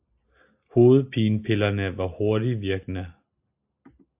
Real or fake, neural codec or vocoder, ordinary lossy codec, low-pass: real; none; AAC, 32 kbps; 3.6 kHz